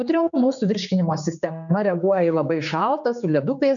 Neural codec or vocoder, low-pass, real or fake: codec, 16 kHz, 4 kbps, X-Codec, HuBERT features, trained on general audio; 7.2 kHz; fake